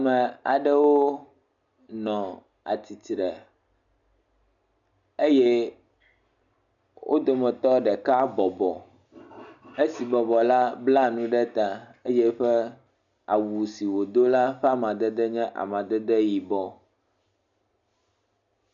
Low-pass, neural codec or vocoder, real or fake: 7.2 kHz; none; real